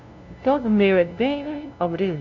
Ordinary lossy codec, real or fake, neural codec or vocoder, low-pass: none; fake; codec, 16 kHz, 0.5 kbps, FunCodec, trained on LibriTTS, 25 frames a second; 7.2 kHz